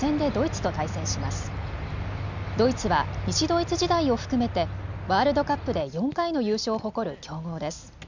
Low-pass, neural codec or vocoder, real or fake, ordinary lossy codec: 7.2 kHz; none; real; none